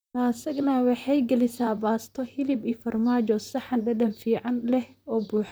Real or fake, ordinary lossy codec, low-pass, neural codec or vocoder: fake; none; none; vocoder, 44.1 kHz, 128 mel bands, Pupu-Vocoder